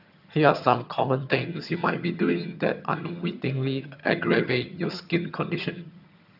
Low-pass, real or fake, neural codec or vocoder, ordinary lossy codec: 5.4 kHz; fake; vocoder, 22.05 kHz, 80 mel bands, HiFi-GAN; none